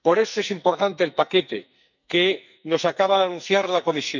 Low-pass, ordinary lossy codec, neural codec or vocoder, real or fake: 7.2 kHz; none; codec, 44.1 kHz, 2.6 kbps, SNAC; fake